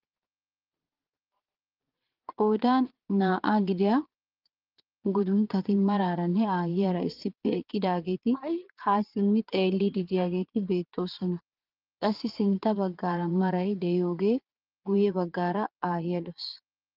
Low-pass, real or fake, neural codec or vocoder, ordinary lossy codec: 5.4 kHz; fake; vocoder, 22.05 kHz, 80 mel bands, Vocos; Opus, 32 kbps